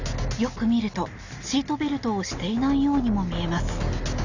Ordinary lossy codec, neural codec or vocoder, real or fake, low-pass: none; none; real; 7.2 kHz